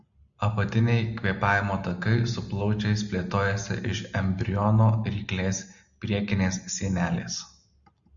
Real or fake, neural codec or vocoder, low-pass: real; none; 7.2 kHz